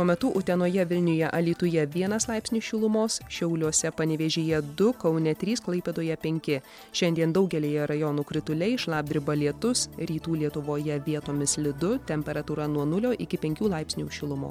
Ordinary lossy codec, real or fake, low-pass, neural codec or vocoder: MP3, 96 kbps; real; 19.8 kHz; none